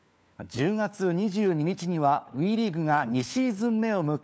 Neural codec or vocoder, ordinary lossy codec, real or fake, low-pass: codec, 16 kHz, 4 kbps, FunCodec, trained on LibriTTS, 50 frames a second; none; fake; none